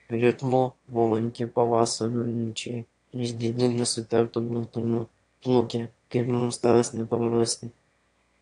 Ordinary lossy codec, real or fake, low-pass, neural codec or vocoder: AAC, 64 kbps; fake; 9.9 kHz; autoencoder, 22.05 kHz, a latent of 192 numbers a frame, VITS, trained on one speaker